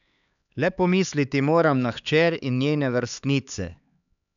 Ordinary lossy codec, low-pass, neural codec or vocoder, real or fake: none; 7.2 kHz; codec, 16 kHz, 4 kbps, X-Codec, HuBERT features, trained on LibriSpeech; fake